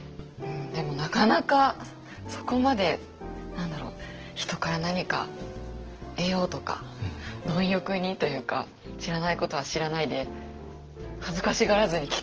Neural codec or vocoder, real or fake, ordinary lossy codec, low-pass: none; real; Opus, 16 kbps; 7.2 kHz